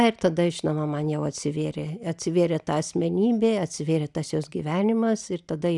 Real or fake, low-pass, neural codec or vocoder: real; 10.8 kHz; none